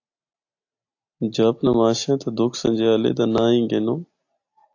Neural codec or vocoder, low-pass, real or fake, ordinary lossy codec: none; 7.2 kHz; real; AAC, 48 kbps